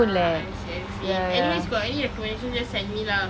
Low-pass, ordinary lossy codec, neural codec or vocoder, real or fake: none; none; none; real